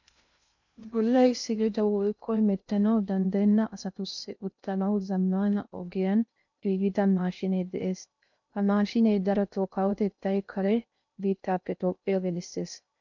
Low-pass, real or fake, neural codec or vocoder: 7.2 kHz; fake; codec, 16 kHz in and 24 kHz out, 0.6 kbps, FocalCodec, streaming, 2048 codes